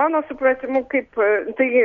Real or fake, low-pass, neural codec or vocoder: real; 7.2 kHz; none